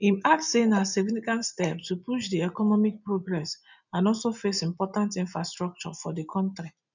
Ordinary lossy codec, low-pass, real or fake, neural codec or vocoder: none; 7.2 kHz; real; none